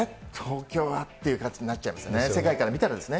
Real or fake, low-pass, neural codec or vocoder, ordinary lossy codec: real; none; none; none